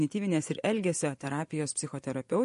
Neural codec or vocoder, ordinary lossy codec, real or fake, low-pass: vocoder, 24 kHz, 100 mel bands, Vocos; MP3, 48 kbps; fake; 10.8 kHz